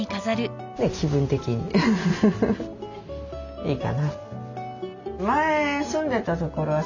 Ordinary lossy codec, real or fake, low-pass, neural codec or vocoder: none; real; 7.2 kHz; none